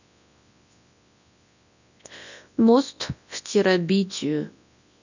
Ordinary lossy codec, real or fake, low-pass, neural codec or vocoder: none; fake; 7.2 kHz; codec, 24 kHz, 0.9 kbps, WavTokenizer, large speech release